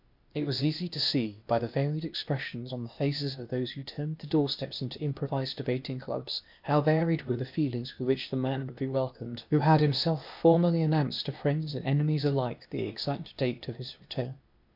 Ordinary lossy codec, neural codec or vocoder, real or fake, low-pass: MP3, 48 kbps; codec, 16 kHz, 0.8 kbps, ZipCodec; fake; 5.4 kHz